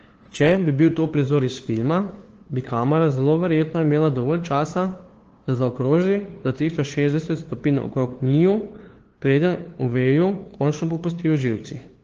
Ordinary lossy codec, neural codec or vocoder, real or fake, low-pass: Opus, 16 kbps; codec, 16 kHz, 2 kbps, FunCodec, trained on LibriTTS, 25 frames a second; fake; 7.2 kHz